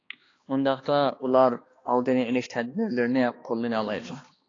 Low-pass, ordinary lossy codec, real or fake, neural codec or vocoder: 7.2 kHz; AAC, 32 kbps; fake; codec, 16 kHz, 2 kbps, X-Codec, HuBERT features, trained on balanced general audio